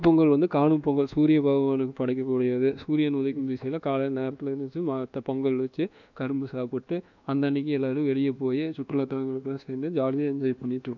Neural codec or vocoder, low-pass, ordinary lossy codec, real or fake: codec, 24 kHz, 1.2 kbps, DualCodec; 7.2 kHz; none; fake